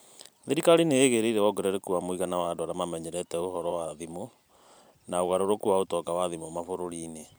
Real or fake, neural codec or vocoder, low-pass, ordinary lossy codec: fake; vocoder, 44.1 kHz, 128 mel bands every 512 samples, BigVGAN v2; none; none